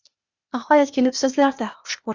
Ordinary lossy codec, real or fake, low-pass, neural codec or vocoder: Opus, 64 kbps; fake; 7.2 kHz; codec, 16 kHz, 0.8 kbps, ZipCodec